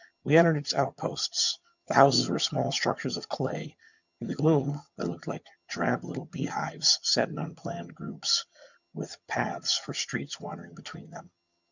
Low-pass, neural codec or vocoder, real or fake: 7.2 kHz; vocoder, 22.05 kHz, 80 mel bands, HiFi-GAN; fake